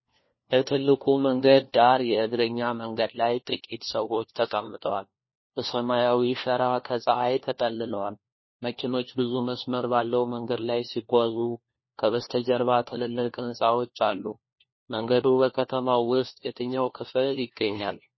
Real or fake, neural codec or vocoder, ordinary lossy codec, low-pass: fake; codec, 16 kHz, 1 kbps, FunCodec, trained on LibriTTS, 50 frames a second; MP3, 24 kbps; 7.2 kHz